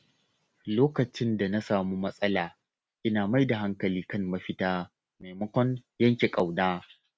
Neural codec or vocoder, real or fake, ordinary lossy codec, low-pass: none; real; none; none